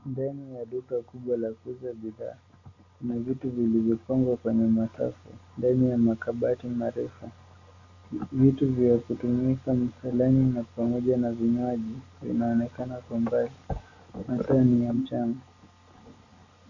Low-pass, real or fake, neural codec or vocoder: 7.2 kHz; real; none